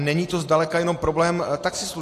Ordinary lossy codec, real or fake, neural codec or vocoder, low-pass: AAC, 48 kbps; real; none; 14.4 kHz